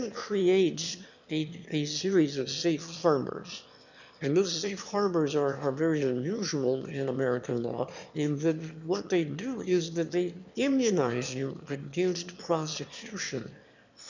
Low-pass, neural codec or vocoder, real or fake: 7.2 kHz; autoencoder, 22.05 kHz, a latent of 192 numbers a frame, VITS, trained on one speaker; fake